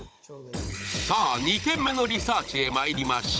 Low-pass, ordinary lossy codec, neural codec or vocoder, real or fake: none; none; codec, 16 kHz, 16 kbps, FunCodec, trained on Chinese and English, 50 frames a second; fake